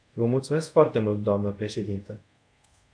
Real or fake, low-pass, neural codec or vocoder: fake; 9.9 kHz; codec, 24 kHz, 0.5 kbps, DualCodec